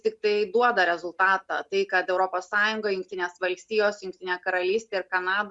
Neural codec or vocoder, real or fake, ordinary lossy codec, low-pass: none; real; Opus, 64 kbps; 10.8 kHz